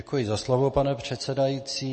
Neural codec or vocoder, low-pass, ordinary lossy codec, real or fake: none; 9.9 kHz; MP3, 32 kbps; real